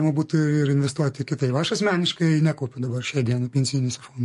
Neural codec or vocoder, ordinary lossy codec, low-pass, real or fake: codec, 44.1 kHz, 7.8 kbps, DAC; MP3, 48 kbps; 14.4 kHz; fake